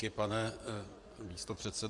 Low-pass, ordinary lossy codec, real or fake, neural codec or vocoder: 10.8 kHz; AAC, 64 kbps; fake; vocoder, 24 kHz, 100 mel bands, Vocos